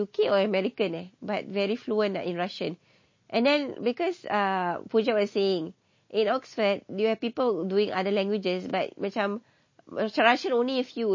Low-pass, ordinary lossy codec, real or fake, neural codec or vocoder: 7.2 kHz; MP3, 32 kbps; real; none